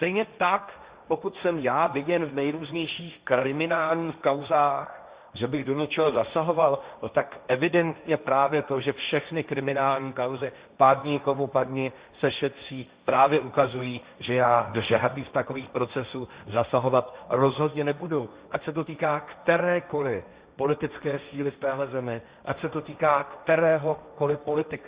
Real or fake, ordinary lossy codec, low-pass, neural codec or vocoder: fake; Opus, 64 kbps; 3.6 kHz; codec, 16 kHz, 1.1 kbps, Voila-Tokenizer